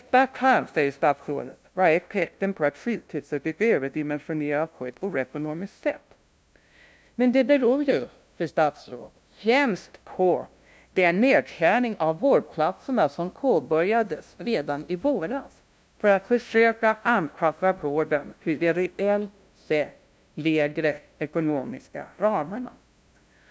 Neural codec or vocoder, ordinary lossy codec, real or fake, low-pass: codec, 16 kHz, 0.5 kbps, FunCodec, trained on LibriTTS, 25 frames a second; none; fake; none